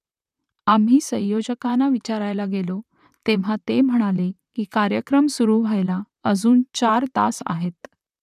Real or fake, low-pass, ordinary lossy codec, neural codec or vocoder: fake; 14.4 kHz; none; vocoder, 44.1 kHz, 128 mel bands, Pupu-Vocoder